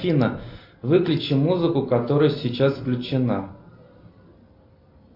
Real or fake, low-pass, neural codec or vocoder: real; 5.4 kHz; none